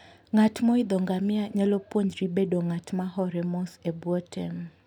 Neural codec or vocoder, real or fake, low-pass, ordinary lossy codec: none; real; 19.8 kHz; none